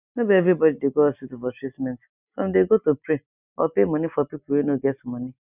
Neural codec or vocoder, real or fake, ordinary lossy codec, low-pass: none; real; none; 3.6 kHz